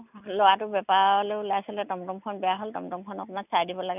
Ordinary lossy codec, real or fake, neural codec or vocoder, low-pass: none; real; none; 3.6 kHz